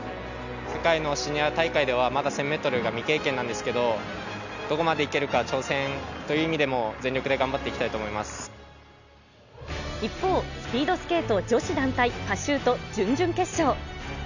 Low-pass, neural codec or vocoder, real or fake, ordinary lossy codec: 7.2 kHz; none; real; none